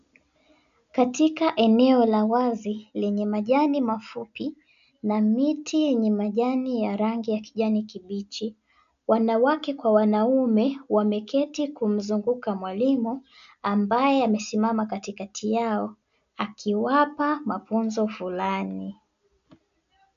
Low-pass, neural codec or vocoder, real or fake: 7.2 kHz; none; real